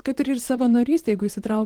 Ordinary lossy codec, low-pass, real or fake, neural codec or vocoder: Opus, 16 kbps; 19.8 kHz; fake; vocoder, 44.1 kHz, 128 mel bands, Pupu-Vocoder